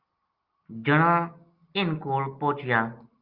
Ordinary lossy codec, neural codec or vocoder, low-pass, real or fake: Opus, 32 kbps; none; 5.4 kHz; real